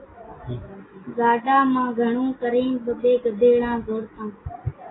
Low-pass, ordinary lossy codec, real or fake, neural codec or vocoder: 7.2 kHz; AAC, 16 kbps; real; none